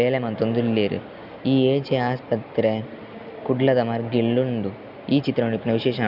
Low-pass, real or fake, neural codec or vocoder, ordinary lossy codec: 5.4 kHz; real; none; none